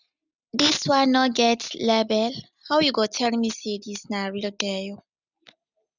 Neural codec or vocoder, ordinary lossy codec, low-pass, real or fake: none; none; 7.2 kHz; real